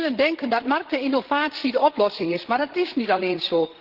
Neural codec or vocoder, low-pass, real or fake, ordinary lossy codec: codec, 16 kHz, 16 kbps, FreqCodec, larger model; 5.4 kHz; fake; Opus, 16 kbps